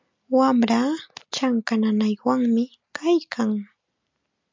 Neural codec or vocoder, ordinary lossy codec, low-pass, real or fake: none; MP3, 64 kbps; 7.2 kHz; real